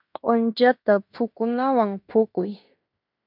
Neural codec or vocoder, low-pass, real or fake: codec, 16 kHz in and 24 kHz out, 0.9 kbps, LongCat-Audio-Codec, fine tuned four codebook decoder; 5.4 kHz; fake